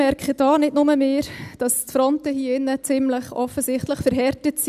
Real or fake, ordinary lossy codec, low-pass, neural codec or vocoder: real; none; 14.4 kHz; none